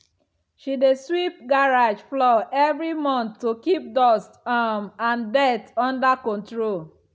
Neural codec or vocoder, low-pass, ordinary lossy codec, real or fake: none; none; none; real